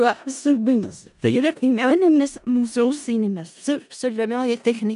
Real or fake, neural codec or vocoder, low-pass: fake; codec, 16 kHz in and 24 kHz out, 0.4 kbps, LongCat-Audio-Codec, four codebook decoder; 10.8 kHz